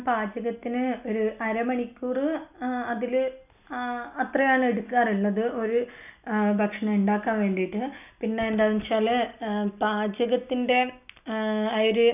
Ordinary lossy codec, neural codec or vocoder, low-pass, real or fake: none; none; 3.6 kHz; real